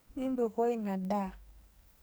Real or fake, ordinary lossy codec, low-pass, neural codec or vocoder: fake; none; none; codec, 44.1 kHz, 2.6 kbps, SNAC